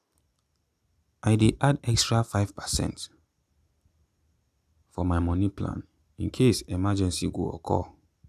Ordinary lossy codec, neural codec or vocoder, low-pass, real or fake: none; none; 14.4 kHz; real